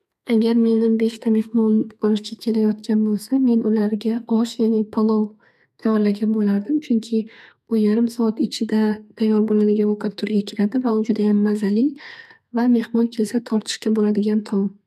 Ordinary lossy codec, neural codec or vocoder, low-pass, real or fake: none; codec, 32 kHz, 1.9 kbps, SNAC; 14.4 kHz; fake